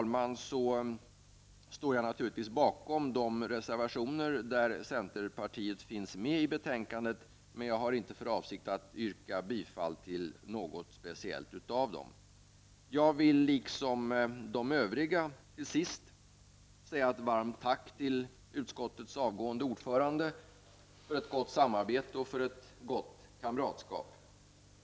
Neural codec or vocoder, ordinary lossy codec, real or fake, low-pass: none; none; real; none